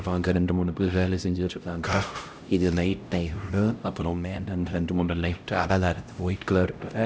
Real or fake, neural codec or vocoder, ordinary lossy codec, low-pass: fake; codec, 16 kHz, 0.5 kbps, X-Codec, HuBERT features, trained on LibriSpeech; none; none